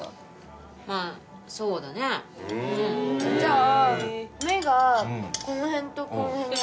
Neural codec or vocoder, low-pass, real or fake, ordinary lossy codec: none; none; real; none